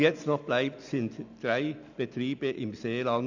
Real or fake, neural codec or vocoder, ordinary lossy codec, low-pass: real; none; none; 7.2 kHz